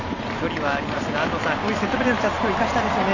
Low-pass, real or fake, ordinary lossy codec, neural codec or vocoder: 7.2 kHz; real; none; none